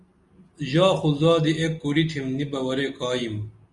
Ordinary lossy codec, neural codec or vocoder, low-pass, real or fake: Opus, 64 kbps; none; 10.8 kHz; real